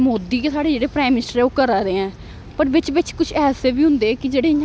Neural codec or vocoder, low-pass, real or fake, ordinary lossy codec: none; none; real; none